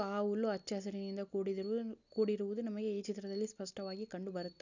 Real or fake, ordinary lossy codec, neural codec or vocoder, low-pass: real; none; none; 7.2 kHz